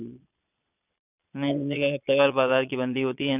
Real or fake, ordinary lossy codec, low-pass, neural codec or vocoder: fake; none; 3.6 kHz; vocoder, 22.05 kHz, 80 mel bands, Vocos